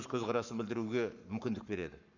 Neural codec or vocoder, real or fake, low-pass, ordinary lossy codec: codec, 44.1 kHz, 7.8 kbps, Pupu-Codec; fake; 7.2 kHz; none